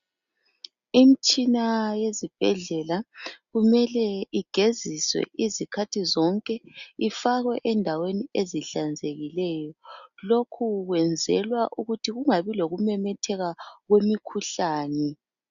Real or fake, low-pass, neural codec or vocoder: real; 7.2 kHz; none